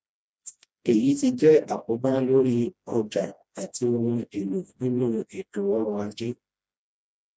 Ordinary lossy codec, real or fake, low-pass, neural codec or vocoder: none; fake; none; codec, 16 kHz, 1 kbps, FreqCodec, smaller model